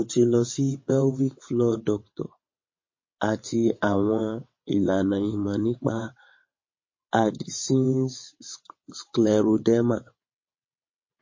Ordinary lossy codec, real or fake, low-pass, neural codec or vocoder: MP3, 32 kbps; fake; 7.2 kHz; vocoder, 22.05 kHz, 80 mel bands, WaveNeXt